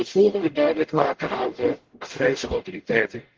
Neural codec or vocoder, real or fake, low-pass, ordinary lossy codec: codec, 44.1 kHz, 0.9 kbps, DAC; fake; 7.2 kHz; Opus, 16 kbps